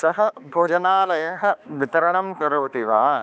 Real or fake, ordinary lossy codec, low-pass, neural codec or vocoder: fake; none; none; codec, 16 kHz, 2 kbps, X-Codec, HuBERT features, trained on balanced general audio